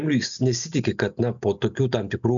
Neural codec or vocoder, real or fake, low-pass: none; real; 7.2 kHz